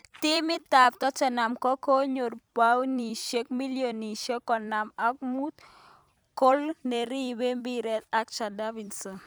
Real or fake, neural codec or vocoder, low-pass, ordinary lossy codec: fake; vocoder, 44.1 kHz, 128 mel bands every 256 samples, BigVGAN v2; none; none